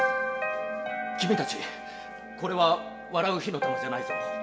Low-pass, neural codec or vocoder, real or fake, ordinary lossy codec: none; none; real; none